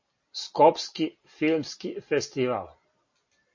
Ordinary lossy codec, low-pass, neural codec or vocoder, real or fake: MP3, 32 kbps; 7.2 kHz; none; real